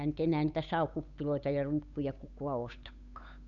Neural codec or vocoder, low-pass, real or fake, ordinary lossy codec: codec, 16 kHz, 8 kbps, FunCodec, trained on Chinese and English, 25 frames a second; 7.2 kHz; fake; none